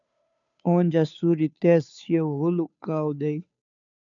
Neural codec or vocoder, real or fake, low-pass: codec, 16 kHz, 2 kbps, FunCodec, trained on Chinese and English, 25 frames a second; fake; 7.2 kHz